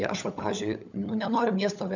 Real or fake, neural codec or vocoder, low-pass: fake; codec, 16 kHz, 16 kbps, FunCodec, trained on LibriTTS, 50 frames a second; 7.2 kHz